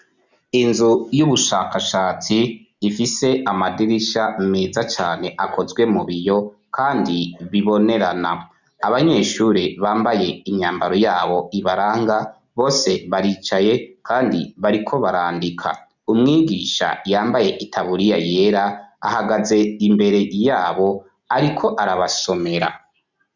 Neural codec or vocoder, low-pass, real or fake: none; 7.2 kHz; real